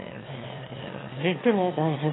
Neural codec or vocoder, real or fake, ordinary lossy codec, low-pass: autoencoder, 22.05 kHz, a latent of 192 numbers a frame, VITS, trained on one speaker; fake; AAC, 16 kbps; 7.2 kHz